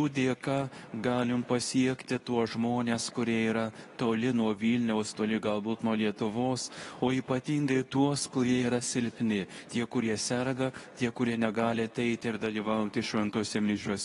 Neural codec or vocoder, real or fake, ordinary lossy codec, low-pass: codec, 24 kHz, 0.9 kbps, WavTokenizer, medium speech release version 2; fake; AAC, 32 kbps; 10.8 kHz